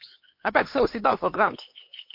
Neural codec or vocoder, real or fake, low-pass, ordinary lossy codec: codec, 16 kHz, 4 kbps, X-Codec, HuBERT features, trained on LibriSpeech; fake; 5.4 kHz; MP3, 32 kbps